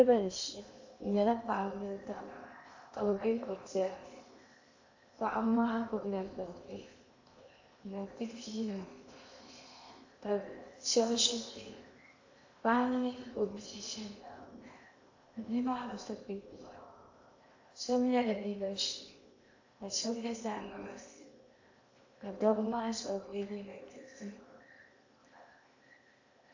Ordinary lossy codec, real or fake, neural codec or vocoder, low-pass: AAC, 48 kbps; fake; codec, 16 kHz in and 24 kHz out, 0.8 kbps, FocalCodec, streaming, 65536 codes; 7.2 kHz